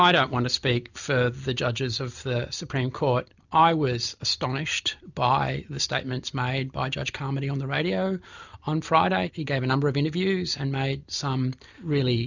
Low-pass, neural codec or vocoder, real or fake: 7.2 kHz; none; real